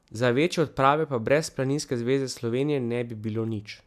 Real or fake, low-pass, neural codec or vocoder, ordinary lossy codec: real; 14.4 kHz; none; MP3, 96 kbps